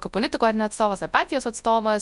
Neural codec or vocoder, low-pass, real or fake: codec, 24 kHz, 0.9 kbps, WavTokenizer, large speech release; 10.8 kHz; fake